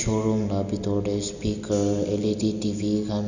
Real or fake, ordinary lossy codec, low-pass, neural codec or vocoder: real; none; 7.2 kHz; none